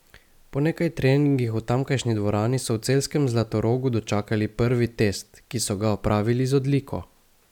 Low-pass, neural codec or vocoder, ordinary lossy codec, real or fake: 19.8 kHz; none; none; real